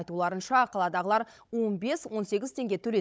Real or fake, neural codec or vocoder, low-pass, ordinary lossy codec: fake; codec, 16 kHz, 16 kbps, FunCodec, trained on Chinese and English, 50 frames a second; none; none